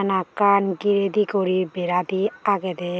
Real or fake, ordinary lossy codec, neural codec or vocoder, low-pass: real; none; none; none